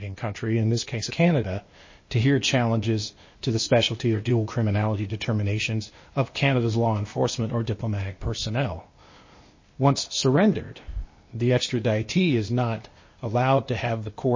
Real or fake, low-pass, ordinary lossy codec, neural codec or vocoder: fake; 7.2 kHz; MP3, 32 kbps; codec, 16 kHz, 0.8 kbps, ZipCodec